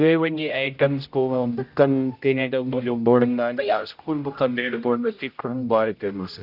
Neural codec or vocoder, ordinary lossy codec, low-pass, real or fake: codec, 16 kHz, 0.5 kbps, X-Codec, HuBERT features, trained on general audio; none; 5.4 kHz; fake